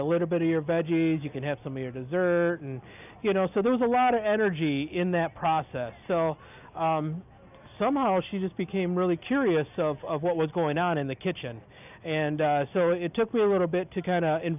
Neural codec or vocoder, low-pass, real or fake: none; 3.6 kHz; real